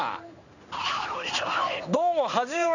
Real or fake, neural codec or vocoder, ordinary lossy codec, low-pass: fake; codec, 16 kHz in and 24 kHz out, 1 kbps, XY-Tokenizer; none; 7.2 kHz